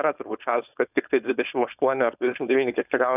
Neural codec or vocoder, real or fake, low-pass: codec, 16 kHz, 4.8 kbps, FACodec; fake; 3.6 kHz